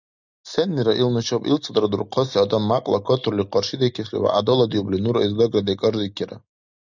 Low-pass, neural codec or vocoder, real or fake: 7.2 kHz; none; real